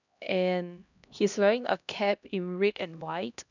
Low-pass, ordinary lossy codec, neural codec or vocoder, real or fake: 7.2 kHz; none; codec, 16 kHz, 1 kbps, X-Codec, HuBERT features, trained on LibriSpeech; fake